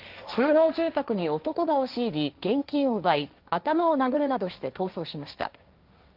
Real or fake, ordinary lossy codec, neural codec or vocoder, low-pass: fake; Opus, 32 kbps; codec, 16 kHz, 1.1 kbps, Voila-Tokenizer; 5.4 kHz